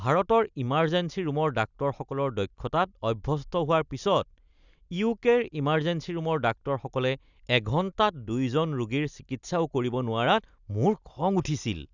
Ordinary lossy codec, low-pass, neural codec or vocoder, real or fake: none; 7.2 kHz; none; real